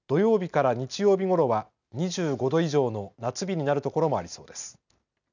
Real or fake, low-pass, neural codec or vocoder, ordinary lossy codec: real; 7.2 kHz; none; none